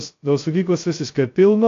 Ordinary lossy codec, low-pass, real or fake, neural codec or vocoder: AAC, 48 kbps; 7.2 kHz; fake; codec, 16 kHz, 0.2 kbps, FocalCodec